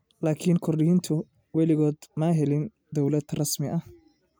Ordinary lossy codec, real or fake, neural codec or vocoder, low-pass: none; real; none; none